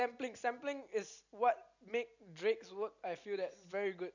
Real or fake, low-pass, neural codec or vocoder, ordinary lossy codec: real; 7.2 kHz; none; none